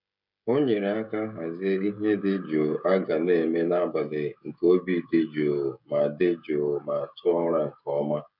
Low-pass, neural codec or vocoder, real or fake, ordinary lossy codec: 5.4 kHz; codec, 16 kHz, 16 kbps, FreqCodec, smaller model; fake; none